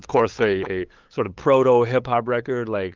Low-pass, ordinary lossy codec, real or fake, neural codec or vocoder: 7.2 kHz; Opus, 24 kbps; fake; codec, 16 kHz, 8 kbps, FunCodec, trained on LibriTTS, 25 frames a second